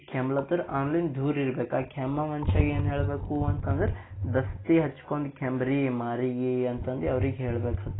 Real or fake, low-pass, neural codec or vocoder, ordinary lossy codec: real; 7.2 kHz; none; AAC, 16 kbps